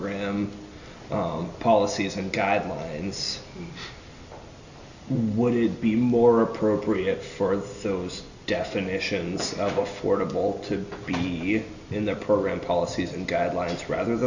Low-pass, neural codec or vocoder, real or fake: 7.2 kHz; none; real